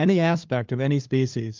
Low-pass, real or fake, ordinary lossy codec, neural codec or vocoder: 7.2 kHz; fake; Opus, 24 kbps; codec, 16 kHz, 2 kbps, FunCodec, trained on LibriTTS, 25 frames a second